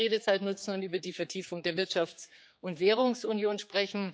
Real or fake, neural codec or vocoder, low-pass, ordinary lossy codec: fake; codec, 16 kHz, 4 kbps, X-Codec, HuBERT features, trained on general audio; none; none